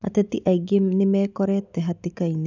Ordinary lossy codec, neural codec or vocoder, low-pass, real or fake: none; none; 7.2 kHz; real